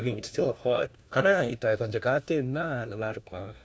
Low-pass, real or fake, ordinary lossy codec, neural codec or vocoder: none; fake; none; codec, 16 kHz, 1 kbps, FunCodec, trained on LibriTTS, 50 frames a second